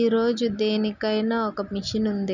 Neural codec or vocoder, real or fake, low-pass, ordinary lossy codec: none; real; 7.2 kHz; none